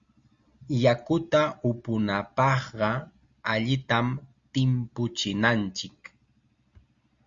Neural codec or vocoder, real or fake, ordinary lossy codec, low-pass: none; real; Opus, 64 kbps; 7.2 kHz